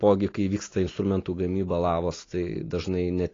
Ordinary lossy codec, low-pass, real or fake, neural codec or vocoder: AAC, 32 kbps; 7.2 kHz; real; none